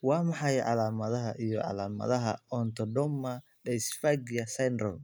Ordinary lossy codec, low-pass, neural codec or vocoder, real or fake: none; none; none; real